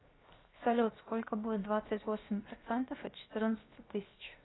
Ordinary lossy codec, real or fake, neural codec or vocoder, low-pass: AAC, 16 kbps; fake; codec, 16 kHz, 0.7 kbps, FocalCodec; 7.2 kHz